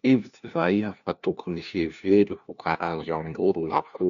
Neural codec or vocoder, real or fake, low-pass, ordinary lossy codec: codec, 16 kHz, 1 kbps, FunCodec, trained on LibriTTS, 50 frames a second; fake; 7.2 kHz; none